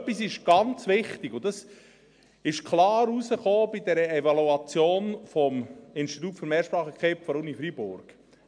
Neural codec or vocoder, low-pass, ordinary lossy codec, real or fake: none; 9.9 kHz; MP3, 64 kbps; real